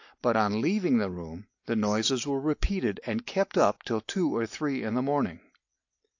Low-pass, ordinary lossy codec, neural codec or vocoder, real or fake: 7.2 kHz; AAC, 48 kbps; none; real